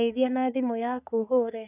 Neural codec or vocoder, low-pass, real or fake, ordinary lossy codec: codec, 44.1 kHz, 3.4 kbps, Pupu-Codec; 3.6 kHz; fake; none